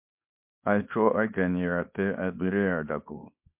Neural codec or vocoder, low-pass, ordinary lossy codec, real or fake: codec, 24 kHz, 0.9 kbps, WavTokenizer, small release; 3.6 kHz; MP3, 32 kbps; fake